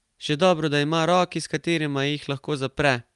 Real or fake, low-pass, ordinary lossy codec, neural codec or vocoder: real; 10.8 kHz; Opus, 32 kbps; none